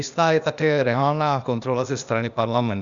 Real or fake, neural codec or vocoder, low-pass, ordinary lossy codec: fake; codec, 16 kHz, 0.8 kbps, ZipCodec; 7.2 kHz; Opus, 64 kbps